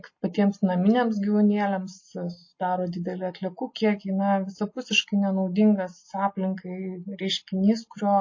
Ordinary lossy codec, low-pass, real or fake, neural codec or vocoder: MP3, 32 kbps; 7.2 kHz; real; none